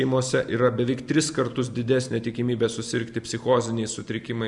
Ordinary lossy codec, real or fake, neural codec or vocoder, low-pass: MP3, 96 kbps; real; none; 10.8 kHz